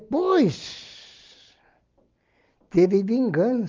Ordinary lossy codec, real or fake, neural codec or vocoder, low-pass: Opus, 32 kbps; real; none; 7.2 kHz